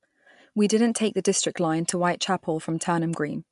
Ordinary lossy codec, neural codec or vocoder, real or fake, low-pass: MP3, 64 kbps; none; real; 10.8 kHz